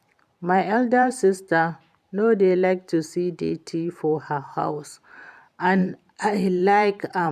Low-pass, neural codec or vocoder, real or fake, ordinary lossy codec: 14.4 kHz; vocoder, 44.1 kHz, 128 mel bands every 512 samples, BigVGAN v2; fake; none